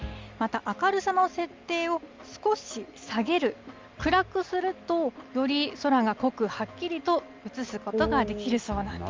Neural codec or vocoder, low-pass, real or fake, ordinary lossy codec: none; 7.2 kHz; real; Opus, 32 kbps